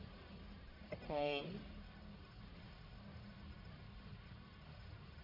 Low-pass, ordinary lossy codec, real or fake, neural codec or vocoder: 5.4 kHz; MP3, 24 kbps; fake; codec, 44.1 kHz, 1.7 kbps, Pupu-Codec